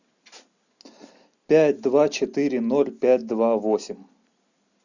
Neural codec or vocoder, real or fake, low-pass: none; real; 7.2 kHz